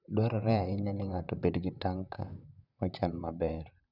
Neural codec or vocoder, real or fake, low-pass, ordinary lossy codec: vocoder, 44.1 kHz, 128 mel bands, Pupu-Vocoder; fake; 5.4 kHz; none